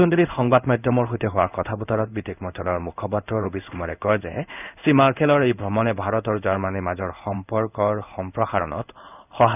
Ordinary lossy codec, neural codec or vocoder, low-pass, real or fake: none; codec, 16 kHz in and 24 kHz out, 1 kbps, XY-Tokenizer; 3.6 kHz; fake